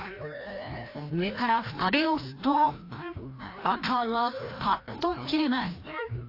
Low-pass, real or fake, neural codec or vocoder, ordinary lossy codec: 5.4 kHz; fake; codec, 16 kHz, 1 kbps, FreqCodec, larger model; AAC, 32 kbps